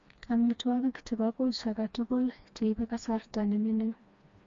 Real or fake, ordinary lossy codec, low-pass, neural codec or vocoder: fake; MP3, 48 kbps; 7.2 kHz; codec, 16 kHz, 2 kbps, FreqCodec, smaller model